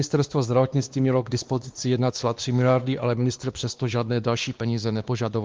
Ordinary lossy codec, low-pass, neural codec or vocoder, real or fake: Opus, 32 kbps; 7.2 kHz; codec, 16 kHz, 2 kbps, X-Codec, WavLM features, trained on Multilingual LibriSpeech; fake